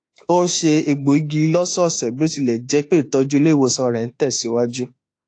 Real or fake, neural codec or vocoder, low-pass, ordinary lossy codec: fake; autoencoder, 48 kHz, 32 numbers a frame, DAC-VAE, trained on Japanese speech; 9.9 kHz; AAC, 48 kbps